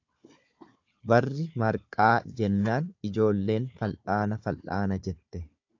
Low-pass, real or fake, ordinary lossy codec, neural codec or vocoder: 7.2 kHz; fake; AAC, 48 kbps; codec, 16 kHz, 4 kbps, FunCodec, trained on Chinese and English, 50 frames a second